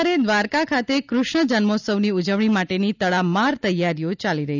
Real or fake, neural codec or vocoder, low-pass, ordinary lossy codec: real; none; 7.2 kHz; none